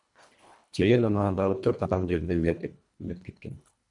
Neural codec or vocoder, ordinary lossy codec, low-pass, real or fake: codec, 24 kHz, 1.5 kbps, HILCodec; MP3, 96 kbps; 10.8 kHz; fake